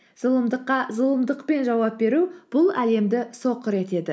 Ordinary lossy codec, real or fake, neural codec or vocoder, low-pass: none; real; none; none